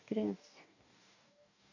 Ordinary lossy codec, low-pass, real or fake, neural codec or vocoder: none; 7.2 kHz; fake; codec, 44.1 kHz, 2.6 kbps, DAC